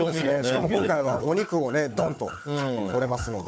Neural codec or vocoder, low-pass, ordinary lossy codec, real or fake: codec, 16 kHz, 4 kbps, FunCodec, trained on Chinese and English, 50 frames a second; none; none; fake